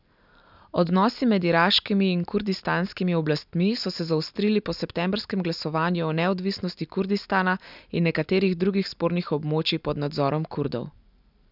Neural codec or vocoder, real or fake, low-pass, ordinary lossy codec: none; real; 5.4 kHz; none